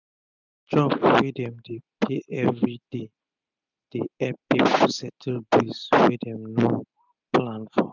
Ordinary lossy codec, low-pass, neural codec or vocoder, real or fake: none; 7.2 kHz; none; real